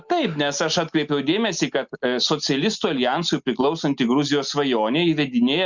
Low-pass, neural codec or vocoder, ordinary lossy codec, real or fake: 7.2 kHz; none; Opus, 64 kbps; real